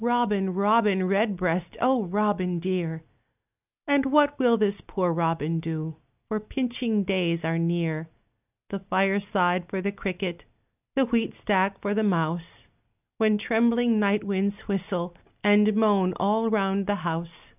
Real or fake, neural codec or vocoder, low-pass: real; none; 3.6 kHz